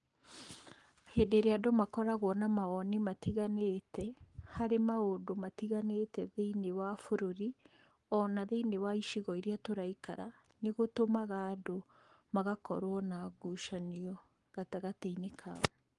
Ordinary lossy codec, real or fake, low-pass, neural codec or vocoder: Opus, 24 kbps; fake; 10.8 kHz; codec, 44.1 kHz, 7.8 kbps, Pupu-Codec